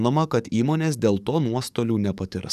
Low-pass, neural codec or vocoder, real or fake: 14.4 kHz; codec, 44.1 kHz, 7.8 kbps, DAC; fake